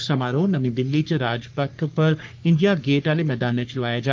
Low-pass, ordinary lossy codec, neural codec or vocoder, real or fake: 7.2 kHz; Opus, 32 kbps; codec, 44.1 kHz, 3.4 kbps, Pupu-Codec; fake